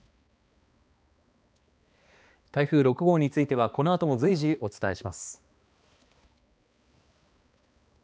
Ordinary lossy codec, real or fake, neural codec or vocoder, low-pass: none; fake; codec, 16 kHz, 2 kbps, X-Codec, HuBERT features, trained on balanced general audio; none